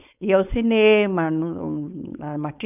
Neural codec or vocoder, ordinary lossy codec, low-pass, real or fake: codec, 16 kHz, 4.8 kbps, FACodec; none; 3.6 kHz; fake